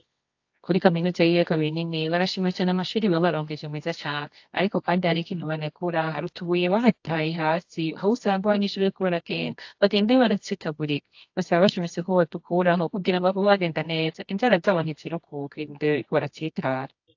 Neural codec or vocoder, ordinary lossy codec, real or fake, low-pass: codec, 24 kHz, 0.9 kbps, WavTokenizer, medium music audio release; AAC, 48 kbps; fake; 7.2 kHz